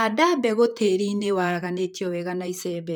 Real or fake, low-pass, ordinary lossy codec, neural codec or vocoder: fake; none; none; vocoder, 44.1 kHz, 128 mel bands, Pupu-Vocoder